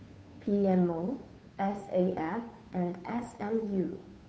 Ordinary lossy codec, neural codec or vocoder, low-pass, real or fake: none; codec, 16 kHz, 2 kbps, FunCodec, trained on Chinese and English, 25 frames a second; none; fake